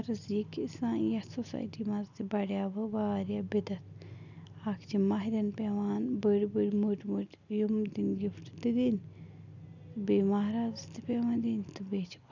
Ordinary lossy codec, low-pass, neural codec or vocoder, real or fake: none; 7.2 kHz; none; real